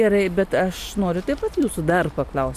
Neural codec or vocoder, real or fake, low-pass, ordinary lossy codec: none; real; 14.4 kHz; AAC, 96 kbps